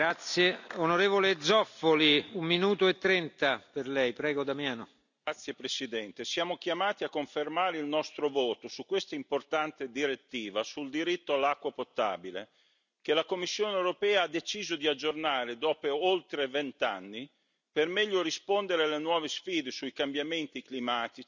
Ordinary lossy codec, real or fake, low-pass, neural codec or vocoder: none; real; 7.2 kHz; none